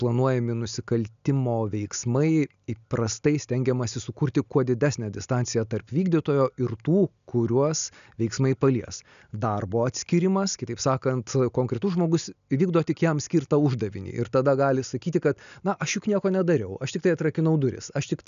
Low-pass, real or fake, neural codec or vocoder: 7.2 kHz; real; none